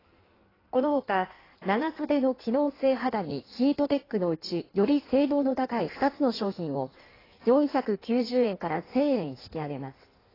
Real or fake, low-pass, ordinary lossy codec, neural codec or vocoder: fake; 5.4 kHz; AAC, 24 kbps; codec, 16 kHz in and 24 kHz out, 1.1 kbps, FireRedTTS-2 codec